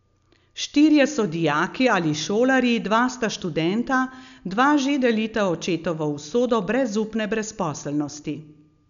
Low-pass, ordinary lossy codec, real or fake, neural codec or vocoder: 7.2 kHz; none; real; none